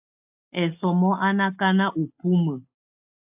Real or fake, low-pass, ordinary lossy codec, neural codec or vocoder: real; 3.6 kHz; AAC, 32 kbps; none